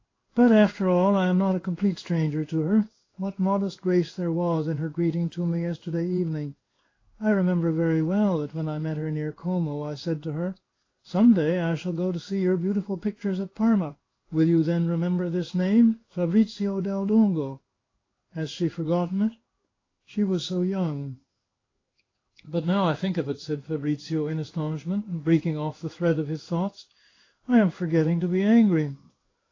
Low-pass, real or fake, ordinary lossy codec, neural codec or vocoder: 7.2 kHz; fake; AAC, 32 kbps; codec, 16 kHz in and 24 kHz out, 1 kbps, XY-Tokenizer